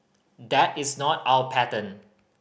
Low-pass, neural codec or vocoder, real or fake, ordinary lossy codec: none; none; real; none